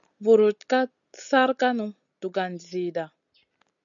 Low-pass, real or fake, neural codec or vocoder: 7.2 kHz; real; none